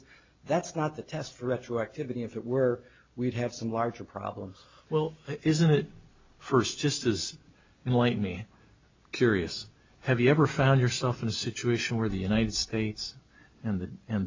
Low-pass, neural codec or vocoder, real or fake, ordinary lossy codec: 7.2 kHz; none; real; MP3, 64 kbps